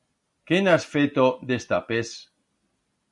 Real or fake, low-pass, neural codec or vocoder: real; 10.8 kHz; none